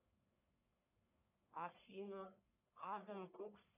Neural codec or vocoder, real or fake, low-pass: codec, 44.1 kHz, 1.7 kbps, Pupu-Codec; fake; 3.6 kHz